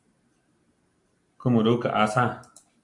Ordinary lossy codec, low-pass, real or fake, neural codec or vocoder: AAC, 64 kbps; 10.8 kHz; real; none